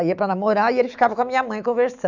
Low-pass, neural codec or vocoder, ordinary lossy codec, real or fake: 7.2 kHz; autoencoder, 48 kHz, 128 numbers a frame, DAC-VAE, trained on Japanese speech; none; fake